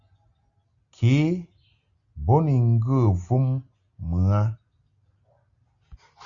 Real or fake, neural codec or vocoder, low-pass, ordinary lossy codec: real; none; 7.2 kHz; Opus, 64 kbps